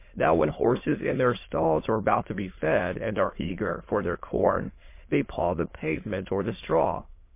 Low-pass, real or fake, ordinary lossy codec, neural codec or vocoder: 3.6 kHz; fake; MP3, 24 kbps; autoencoder, 22.05 kHz, a latent of 192 numbers a frame, VITS, trained on many speakers